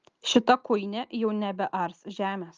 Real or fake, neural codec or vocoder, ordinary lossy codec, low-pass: real; none; Opus, 32 kbps; 7.2 kHz